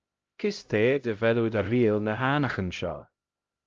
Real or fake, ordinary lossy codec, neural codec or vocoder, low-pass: fake; Opus, 24 kbps; codec, 16 kHz, 0.5 kbps, X-Codec, HuBERT features, trained on LibriSpeech; 7.2 kHz